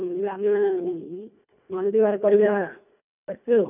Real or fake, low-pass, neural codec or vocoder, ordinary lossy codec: fake; 3.6 kHz; codec, 24 kHz, 1.5 kbps, HILCodec; none